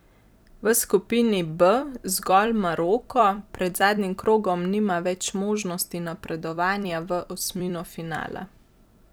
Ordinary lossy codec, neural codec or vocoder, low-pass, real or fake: none; vocoder, 44.1 kHz, 128 mel bands every 256 samples, BigVGAN v2; none; fake